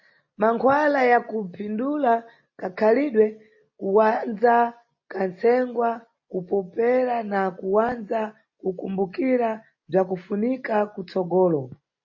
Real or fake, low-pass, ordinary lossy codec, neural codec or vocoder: real; 7.2 kHz; MP3, 32 kbps; none